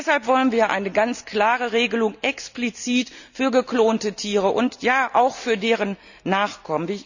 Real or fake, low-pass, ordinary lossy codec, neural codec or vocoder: real; 7.2 kHz; none; none